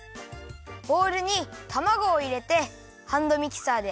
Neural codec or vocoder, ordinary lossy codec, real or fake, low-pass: none; none; real; none